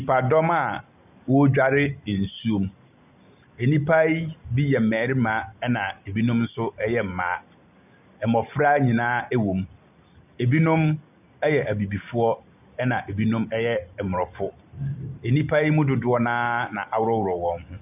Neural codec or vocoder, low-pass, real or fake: none; 3.6 kHz; real